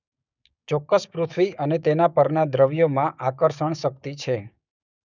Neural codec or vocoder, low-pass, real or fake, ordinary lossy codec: codec, 16 kHz, 6 kbps, DAC; 7.2 kHz; fake; none